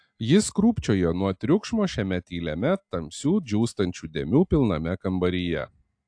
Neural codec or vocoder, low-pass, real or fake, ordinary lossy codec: none; 9.9 kHz; real; AAC, 64 kbps